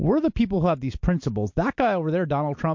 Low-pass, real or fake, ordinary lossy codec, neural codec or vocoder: 7.2 kHz; real; MP3, 48 kbps; none